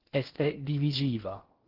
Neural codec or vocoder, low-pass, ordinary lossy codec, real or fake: codec, 16 kHz in and 24 kHz out, 0.6 kbps, FocalCodec, streaming, 4096 codes; 5.4 kHz; Opus, 16 kbps; fake